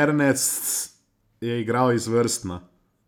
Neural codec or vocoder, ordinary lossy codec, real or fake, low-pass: none; none; real; none